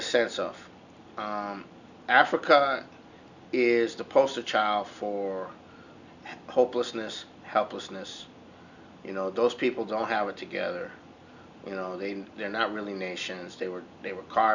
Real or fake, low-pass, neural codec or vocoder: real; 7.2 kHz; none